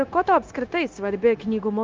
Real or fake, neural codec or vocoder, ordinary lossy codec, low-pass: fake; codec, 16 kHz, 0.9 kbps, LongCat-Audio-Codec; Opus, 32 kbps; 7.2 kHz